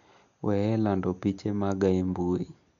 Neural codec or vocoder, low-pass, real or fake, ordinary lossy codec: none; 7.2 kHz; real; none